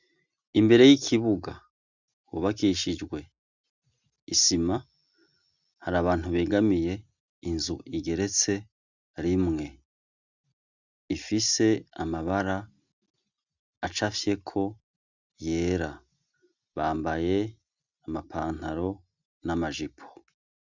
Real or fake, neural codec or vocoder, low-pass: real; none; 7.2 kHz